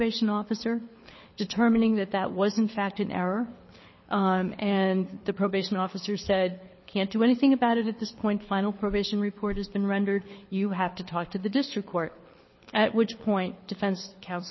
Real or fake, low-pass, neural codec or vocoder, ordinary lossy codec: fake; 7.2 kHz; codec, 24 kHz, 6 kbps, HILCodec; MP3, 24 kbps